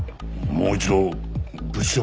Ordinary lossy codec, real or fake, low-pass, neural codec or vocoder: none; real; none; none